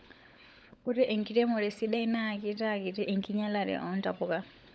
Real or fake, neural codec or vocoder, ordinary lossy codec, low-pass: fake; codec, 16 kHz, 16 kbps, FunCodec, trained on LibriTTS, 50 frames a second; none; none